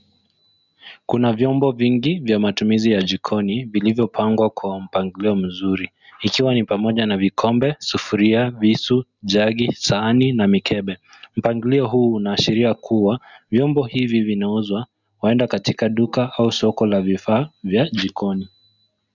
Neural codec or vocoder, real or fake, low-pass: none; real; 7.2 kHz